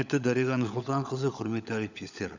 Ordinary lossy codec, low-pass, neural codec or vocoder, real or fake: none; 7.2 kHz; codec, 16 kHz, 16 kbps, FunCodec, trained on LibriTTS, 50 frames a second; fake